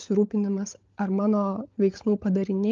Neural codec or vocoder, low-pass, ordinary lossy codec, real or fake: codec, 16 kHz, 16 kbps, FunCodec, trained on LibriTTS, 50 frames a second; 7.2 kHz; Opus, 32 kbps; fake